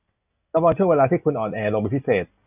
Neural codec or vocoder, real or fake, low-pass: none; real; 3.6 kHz